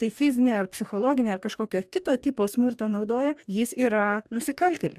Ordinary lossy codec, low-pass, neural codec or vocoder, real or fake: AAC, 96 kbps; 14.4 kHz; codec, 44.1 kHz, 2.6 kbps, DAC; fake